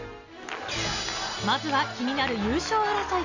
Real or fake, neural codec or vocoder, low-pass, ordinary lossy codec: real; none; 7.2 kHz; AAC, 48 kbps